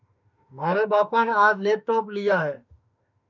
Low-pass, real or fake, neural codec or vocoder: 7.2 kHz; fake; autoencoder, 48 kHz, 32 numbers a frame, DAC-VAE, trained on Japanese speech